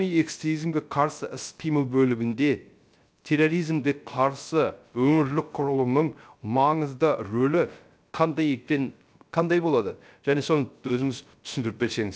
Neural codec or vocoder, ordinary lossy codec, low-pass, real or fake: codec, 16 kHz, 0.3 kbps, FocalCodec; none; none; fake